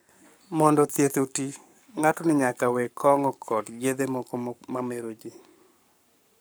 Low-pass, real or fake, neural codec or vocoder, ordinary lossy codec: none; fake; codec, 44.1 kHz, 7.8 kbps, Pupu-Codec; none